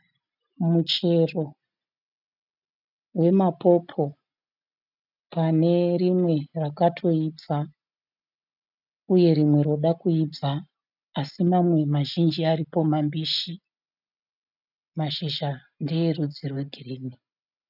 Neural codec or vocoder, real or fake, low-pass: none; real; 5.4 kHz